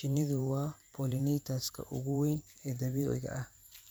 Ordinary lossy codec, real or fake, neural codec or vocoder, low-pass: none; fake; vocoder, 44.1 kHz, 128 mel bands every 512 samples, BigVGAN v2; none